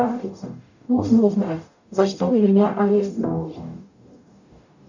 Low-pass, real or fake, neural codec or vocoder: 7.2 kHz; fake; codec, 44.1 kHz, 0.9 kbps, DAC